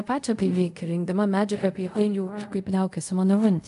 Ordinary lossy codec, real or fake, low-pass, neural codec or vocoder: AAC, 64 kbps; fake; 10.8 kHz; codec, 24 kHz, 0.5 kbps, DualCodec